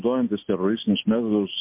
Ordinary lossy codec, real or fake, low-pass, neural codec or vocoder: MP3, 32 kbps; real; 3.6 kHz; none